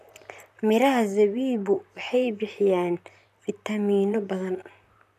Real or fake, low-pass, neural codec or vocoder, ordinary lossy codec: fake; 14.4 kHz; vocoder, 44.1 kHz, 128 mel bands, Pupu-Vocoder; none